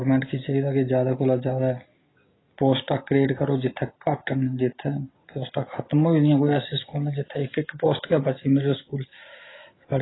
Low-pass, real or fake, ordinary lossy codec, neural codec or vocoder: 7.2 kHz; real; AAC, 16 kbps; none